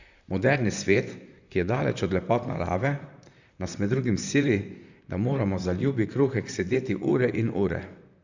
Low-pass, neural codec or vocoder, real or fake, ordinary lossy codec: 7.2 kHz; vocoder, 44.1 kHz, 128 mel bands, Pupu-Vocoder; fake; Opus, 64 kbps